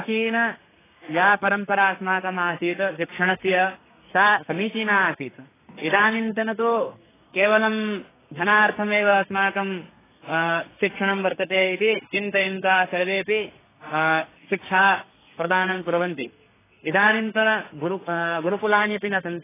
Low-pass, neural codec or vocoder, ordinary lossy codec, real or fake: 3.6 kHz; codec, 32 kHz, 1.9 kbps, SNAC; AAC, 16 kbps; fake